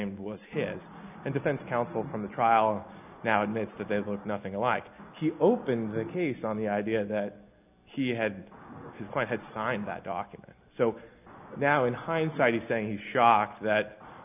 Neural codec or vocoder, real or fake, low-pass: none; real; 3.6 kHz